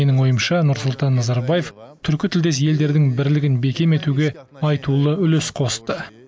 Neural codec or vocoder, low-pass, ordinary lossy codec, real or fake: none; none; none; real